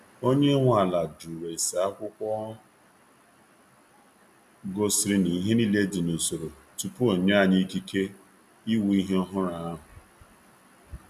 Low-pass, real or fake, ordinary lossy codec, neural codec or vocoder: 14.4 kHz; real; none; none